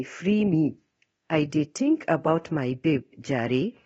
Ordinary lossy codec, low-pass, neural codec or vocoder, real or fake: AAC, 24 kbps; 10.8 kHz; codec, 24 kHz, 0.9 kbps, DualCodec; fake